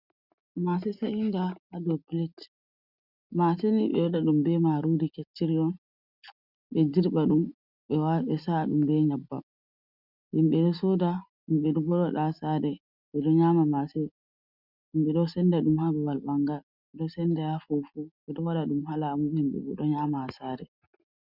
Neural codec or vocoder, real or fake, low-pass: none; real; 5.4 kHz